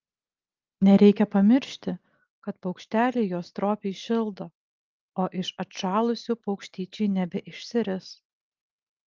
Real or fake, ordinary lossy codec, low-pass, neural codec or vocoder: real; Opus, 24 kbps; 7.2 kHz; none